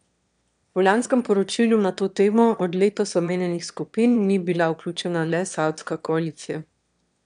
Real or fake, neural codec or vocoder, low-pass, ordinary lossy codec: fake; autoencoder, 22.05 kHz, a latent of 192 numbers a frame, VITS, trained on one speaker; 9.9 kHz; none